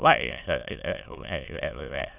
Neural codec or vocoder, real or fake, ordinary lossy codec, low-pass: autoencoder, 22.05 kHz, a latent of 192 numbers a frame, VITS, trained on many speakers; fake; none; 3.6 kHz